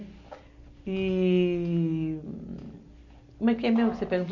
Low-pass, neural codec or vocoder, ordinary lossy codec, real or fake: 7.2 kHz; none; none; real